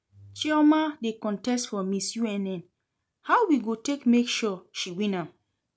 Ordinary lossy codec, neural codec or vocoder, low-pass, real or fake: none; none; none; real